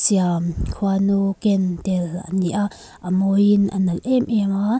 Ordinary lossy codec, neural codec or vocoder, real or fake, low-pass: none; none; real; none